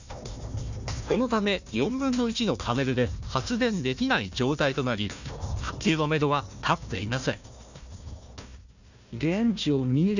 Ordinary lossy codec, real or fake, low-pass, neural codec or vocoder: none; fake; 7.2 kHz; codec, 16 kHz, 1 kbps, FunCodec, trained on Chinese and English, 50 frames a second